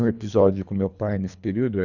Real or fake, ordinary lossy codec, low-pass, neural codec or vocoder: fake; none; 7.2 kHz; codec, 24 kHz, 3 kbps, HILCodec